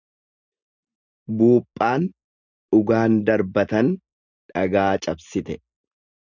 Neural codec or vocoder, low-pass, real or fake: none; 7.2 kHz; real